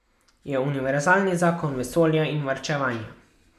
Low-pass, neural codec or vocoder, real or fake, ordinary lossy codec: 14.4 kHz; none; real; none